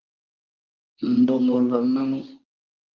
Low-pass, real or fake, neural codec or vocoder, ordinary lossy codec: 7.2 kHz; fake; codec, 24 kHz, 0.9 kbps, WavTokenizer, medium speech release version 2; Opus, 24 kbps